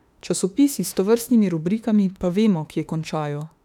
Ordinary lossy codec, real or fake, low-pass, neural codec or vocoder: none; fake; 19.8 kHz; autoencoder, 48 kHz, 32 numbers a frame, DAC-VAE, trained on Japanese speech